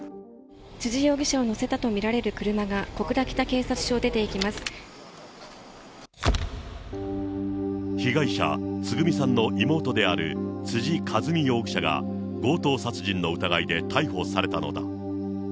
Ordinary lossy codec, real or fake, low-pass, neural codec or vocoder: none; real; none; none